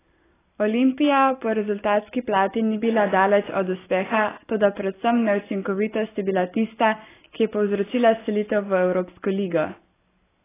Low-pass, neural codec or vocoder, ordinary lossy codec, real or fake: 3.6 kHz; none; AAC, 16 kbps; real